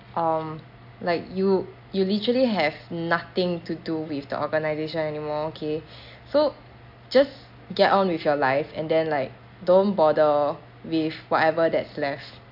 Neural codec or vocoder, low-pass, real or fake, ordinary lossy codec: none; 5.4 kHz; real; AAC, 48 kbps